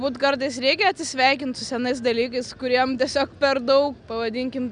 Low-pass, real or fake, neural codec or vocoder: 9.9 kHz; real; none